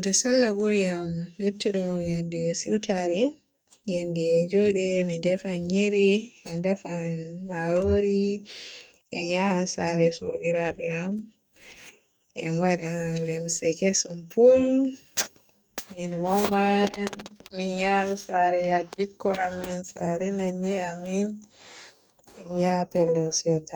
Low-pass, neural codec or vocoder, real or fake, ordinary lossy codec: none; codec, 44.1 kHz, 2.6 kbps, DAC; fake; none